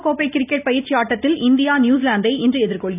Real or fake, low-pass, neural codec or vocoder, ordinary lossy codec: real; 3.6 kHz; none; none